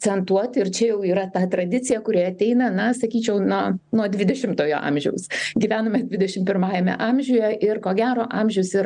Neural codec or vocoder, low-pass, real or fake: none; 9.9 kHz; real